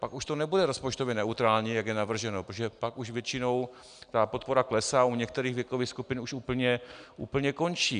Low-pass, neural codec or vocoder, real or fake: 9.9 kHz; none; real